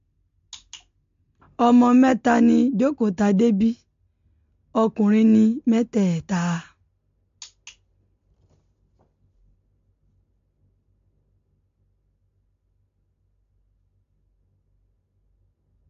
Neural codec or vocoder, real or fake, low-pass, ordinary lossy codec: none; real; 7.2 kHz; MP3, 48 kbps